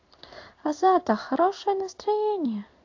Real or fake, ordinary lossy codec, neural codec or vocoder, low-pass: fake; AAC, 48 kbps; codec, 16 kHz in and 24 kHz out, 1 kbps, XY-Tokenizer; 7.2 kHz